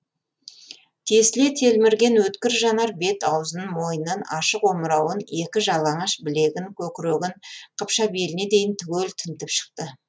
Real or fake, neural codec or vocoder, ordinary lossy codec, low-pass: real; none; none; none